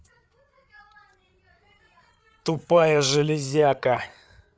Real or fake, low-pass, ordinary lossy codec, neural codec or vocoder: fake; none; none; codec, 16 kHz, 8 kbps, FreqCodec, larger model